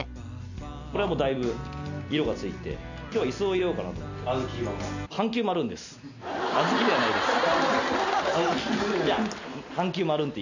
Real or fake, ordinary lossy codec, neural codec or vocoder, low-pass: real; none; none; 7.2 kHz